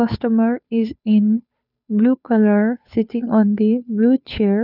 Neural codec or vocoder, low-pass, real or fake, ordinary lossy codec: codec, 16 kHz, 2 kbps, FunCodec, trained on Chinese and English, 25 frames a second; 5.4 kHz; fake; none